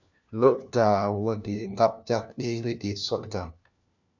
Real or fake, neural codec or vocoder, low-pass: fake; codec, 16 kHz, 1 kbps, FunCodec, trained on LibriTTS, 50 frames a second; 7.2 kHz